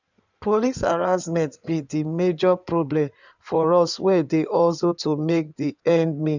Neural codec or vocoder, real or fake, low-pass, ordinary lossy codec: codec, 16 kHz in and 24 kHz out, 2.2 kbps, FireRedTTS-2 codec; fake; 7.2 kHz; none